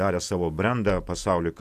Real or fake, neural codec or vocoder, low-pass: fake; vocoder, 48 kHz, 128 mel bands, Vocos; 14.4 kHz